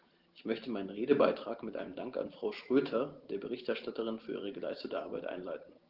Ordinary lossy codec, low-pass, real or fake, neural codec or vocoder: Opus, 16 kbps; 5.4 kHz; real; none